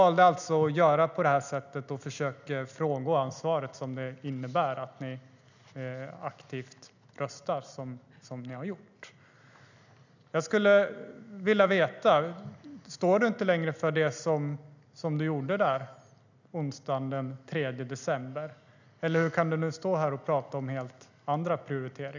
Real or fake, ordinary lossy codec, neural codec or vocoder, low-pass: real; none; none; 7.2 kHz